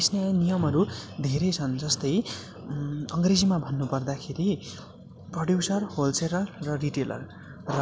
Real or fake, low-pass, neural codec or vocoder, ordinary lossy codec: real; none; none; none